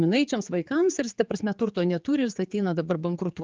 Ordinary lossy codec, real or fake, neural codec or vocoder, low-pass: Opus, 24 kbps; fake; codec, 16 kHz, 4 kbps, X-Codec, HuBERT features, trained on general audio; 7.2 kHz